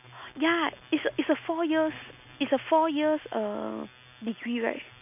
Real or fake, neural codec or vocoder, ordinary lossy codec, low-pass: real; none; none; 3.6 kHz